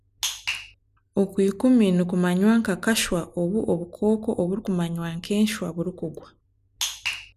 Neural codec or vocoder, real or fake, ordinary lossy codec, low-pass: none; real; none; 14.4 kHz